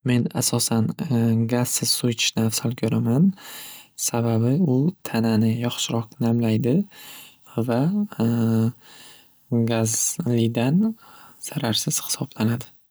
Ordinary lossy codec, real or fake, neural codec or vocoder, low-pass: none; real; none; none